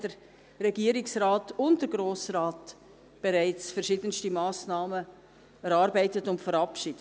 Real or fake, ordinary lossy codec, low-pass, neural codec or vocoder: real; none; none; none